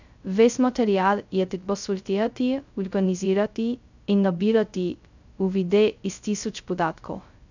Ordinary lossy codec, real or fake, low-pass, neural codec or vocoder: none; fake; 7.2 kHz; codec, 16 kHz, 0.2 kbps, FocalCodec